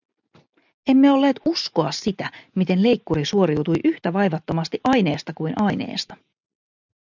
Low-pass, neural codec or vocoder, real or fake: 7.2 kHz; none; real